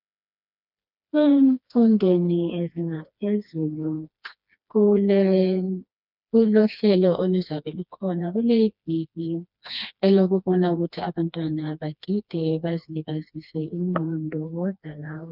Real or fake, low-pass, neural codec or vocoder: fake; 5.4 kHz; codec, 16 kHz, 2 kbps, FreqCodec, smaller model